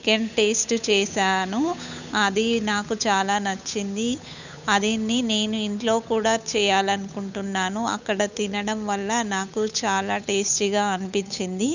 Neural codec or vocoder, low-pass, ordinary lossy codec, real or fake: codec, 16 kHz, 8 kbps, FunCodec, trained on Chinese and English, 25 frames a second; 7.2 kHz; none; fake